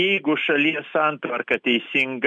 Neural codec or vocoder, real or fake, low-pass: none; real; 10.8 kHz